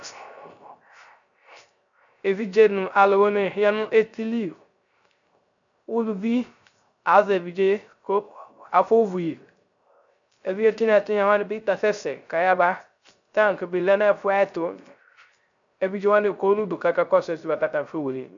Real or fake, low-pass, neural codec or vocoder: fake; 7.2 kHz; codec, 16 kHz, 0.3 kbps, FocalCodec